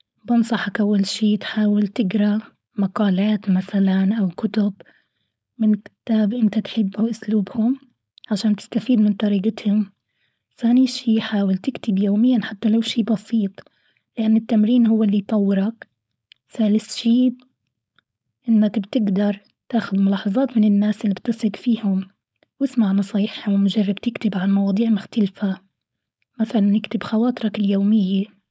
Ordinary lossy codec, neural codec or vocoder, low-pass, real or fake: none; codec, 16 kHz, 4.8 kbps, FACodec; none; fake